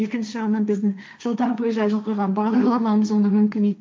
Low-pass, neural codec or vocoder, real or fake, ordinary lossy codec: none; codec, 16 kHz, 1.1 kbps, Voila-Tokenizer; fake; none